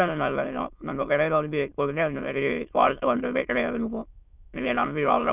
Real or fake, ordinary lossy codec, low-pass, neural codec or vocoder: fake; none; 3.6 kHz; autoencoder, 22.05 kHz, a latent of 192 numbers a frame, VITS, trained on many speakers